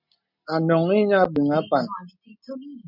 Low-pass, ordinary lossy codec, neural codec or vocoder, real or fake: 5.4 kHz; MP3, 48 kbps; none; real